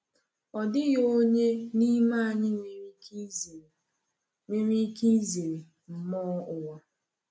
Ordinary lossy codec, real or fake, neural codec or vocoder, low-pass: none; real; none; none